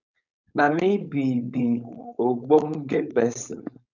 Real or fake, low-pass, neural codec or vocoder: fake; 7.2 kHz; codec, 16 kHz, 4.8 kbps, FACodec